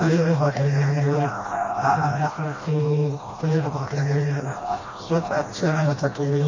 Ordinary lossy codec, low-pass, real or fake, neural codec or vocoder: MP3, 32 kbps; 7.2 kHz; fake; codec, 16 kHz, 1 kbps, FreqCodec, smaller model